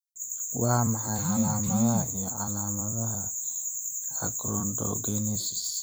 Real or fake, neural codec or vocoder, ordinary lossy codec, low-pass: fake; vocoder, 44.1 kHz, 128 mel bands every 256 samples, BigVGAN v2; none; none